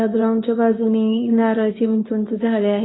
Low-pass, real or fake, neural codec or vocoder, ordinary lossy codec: 7.2 kHz; fake; codec, 24 kHz, 0.9 kbps, WavTokenizer, medium speech release version 2; AAC, 16 kbps